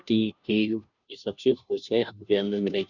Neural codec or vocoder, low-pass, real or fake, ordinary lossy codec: codec, 16 kHz, 0.5 kbps, FunCodec, trained on Chinese and English, 25 frames a second; 7.2 kHz; fake; none